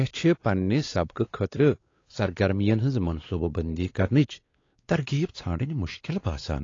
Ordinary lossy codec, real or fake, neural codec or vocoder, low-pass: AAC, 32 kbps; fake; codec, 16 kHz, 4 kbps, X-Codec, WavLM features, trained on Multilingual LibriSpeech; 7.2 kHz